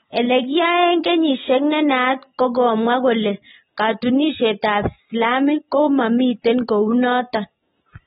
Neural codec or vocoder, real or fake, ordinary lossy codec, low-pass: none; real; AAC, 16 kbps; 19.8 kHz